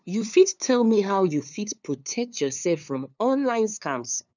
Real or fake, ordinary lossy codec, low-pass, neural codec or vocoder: fake; none; 7.2 kHz; codec, 16 kHz, 2 kbps, FunCodec, trained on LibriTTS, 25 frames a second